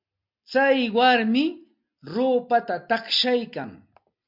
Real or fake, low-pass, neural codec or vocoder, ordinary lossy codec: real; 5.4 kHz; none; MP3, 48 kbps